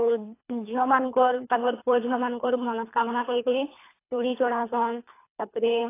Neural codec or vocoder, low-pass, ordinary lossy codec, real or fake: codec, 24 kHz, 3 kbps, HILCodec; 3.6 kHz; AAC, 24 kbps; fake